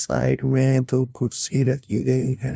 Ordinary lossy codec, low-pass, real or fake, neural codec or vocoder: none; none; fake; codec, 16 kHz, 0.5 kbps, FunCodec, trained on LibriTTS, 25 frames a second